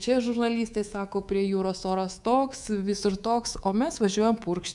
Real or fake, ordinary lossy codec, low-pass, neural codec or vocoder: fake; MP3, 96 kbps; 10.8 kHz; codec, 24 kHz, 3.1 kbps, DualCodec